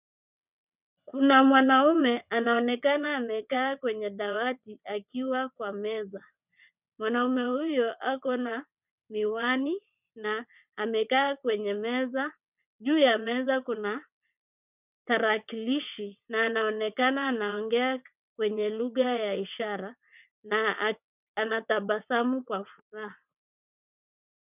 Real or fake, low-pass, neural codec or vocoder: fake; 3.6 kHz; vocoder, 22.05 kHz, 80 mel bands, WaveNeXt